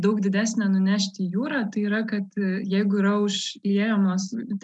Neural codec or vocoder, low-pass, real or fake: none; 10.8 kHz; real